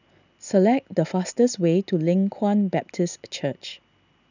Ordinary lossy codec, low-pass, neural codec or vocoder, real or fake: none; 7.2 kHz; none; real